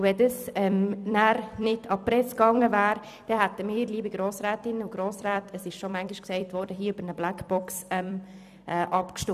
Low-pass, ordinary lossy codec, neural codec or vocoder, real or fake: 14.4 kHz; none; vocoder, 44.1 kHz, 128 mel bands every 256 samples, BigVGAN v2; fake